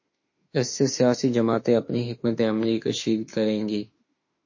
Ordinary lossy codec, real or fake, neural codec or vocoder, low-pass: MP3, 32 kbps; fake; autoencoder, 48 kHz, 32 numbers a frame, DAC-VAE, trained on Japanese speech; 7.2 kHz